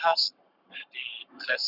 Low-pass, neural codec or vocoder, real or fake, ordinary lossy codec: 5.4 kHz; codec, 44.1 kHz, 7.8 kbps, Pupu-Codec; fake; Opus, 64 kbps